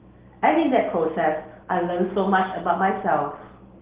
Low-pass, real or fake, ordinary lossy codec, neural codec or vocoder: 3.6 kHz; real; Opus, 16 kbps; none